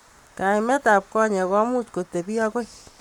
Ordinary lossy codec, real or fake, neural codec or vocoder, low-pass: none; fake; codec, 44.1 kHz, 7.8 kbps, Pupu-Codec; 19.8 kHz